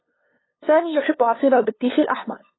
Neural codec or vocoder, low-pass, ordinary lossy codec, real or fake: codec, 16 kHz, 2 kbps, FunCodec, trained on LibriTTS, 25 frames a second; 7.2 kHz; AAC, 16 kbps; fake